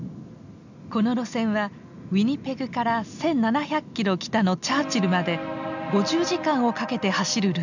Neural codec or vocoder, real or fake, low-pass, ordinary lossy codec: none; real; 7.2 kHz; none